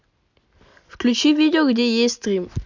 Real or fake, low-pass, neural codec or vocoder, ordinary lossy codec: real; 7.2 kHz; none; none